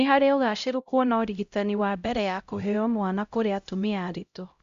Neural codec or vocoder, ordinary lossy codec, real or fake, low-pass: codec, 16 kHz, 0.5 kbps, X-Codec, HuBERT features, trained on LibriSpeech; Opus, 64 kbps; fake; 7.2 kHz